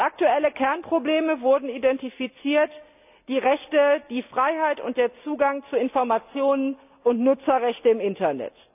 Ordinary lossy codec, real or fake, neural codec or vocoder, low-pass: none; real; none; 3.6 kHz